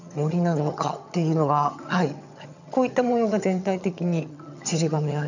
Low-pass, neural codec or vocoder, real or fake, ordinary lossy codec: 7.2 kHz; vocoder, 22.05 kHz, 80 mel bands, HiFi-GAN; fake; none